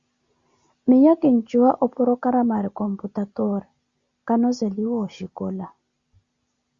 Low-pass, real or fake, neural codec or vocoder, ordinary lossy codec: 7.2 kHz; real; none; Opus, 64 kbps